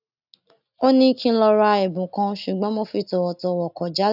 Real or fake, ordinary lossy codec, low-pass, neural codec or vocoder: real; none; 5.4 kHz; none